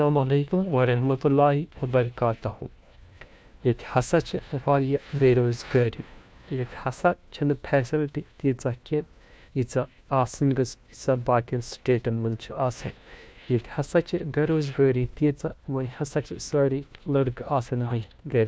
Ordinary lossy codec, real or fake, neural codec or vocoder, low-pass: none; fake; codec, 16 kHz, 1 kbps, FunCodec, trained on LibriTTS, 50 frames a second; none